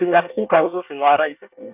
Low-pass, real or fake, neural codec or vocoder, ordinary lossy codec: 3.6 kHz; fake; codec, 24 kHz, 1 kbps, SNAC; none